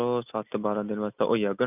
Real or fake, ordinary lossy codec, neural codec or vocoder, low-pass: real; none; none; 3.6 kHz